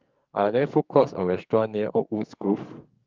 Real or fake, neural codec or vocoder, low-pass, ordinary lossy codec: fake; codec, 44.1 kHz, 2.6 kbps, SNAC; 7.2 kHz; Opus, 24 kbps